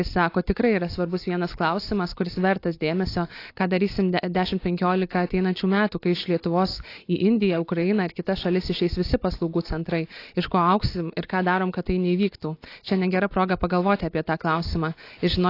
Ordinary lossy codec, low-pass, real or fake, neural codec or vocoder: AAC, 32 kbps; 5.4 kHz; real; none